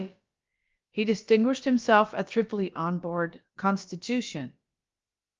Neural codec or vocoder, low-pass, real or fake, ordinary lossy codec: codec, 16 kHz, about 1 kbps, DyCAST, with the encoder's durations; 7.2 kHz; fake; Opus, 32 kbps